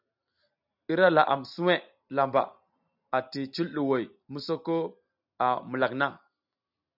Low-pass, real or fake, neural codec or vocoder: 5.4 kHz; real; none